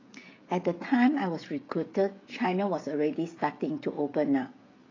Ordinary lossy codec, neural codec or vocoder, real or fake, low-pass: AAC, 32 kbps; codec, 16 kHz, 16 kbps, FreqCodec, smaller model; fake; 7.2 kHz